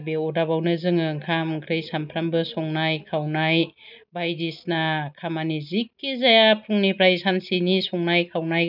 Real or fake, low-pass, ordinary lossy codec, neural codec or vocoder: real; 5.4 kHz; none; none